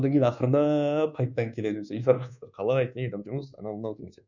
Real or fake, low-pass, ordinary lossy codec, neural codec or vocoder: fake; 7.2 kHz; none; codec, 24 kHz, 1.2 kbps, DualCodec